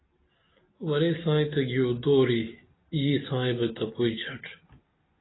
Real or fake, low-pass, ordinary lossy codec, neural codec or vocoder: real; 7.2 kHz; AAC, 16 kbps; none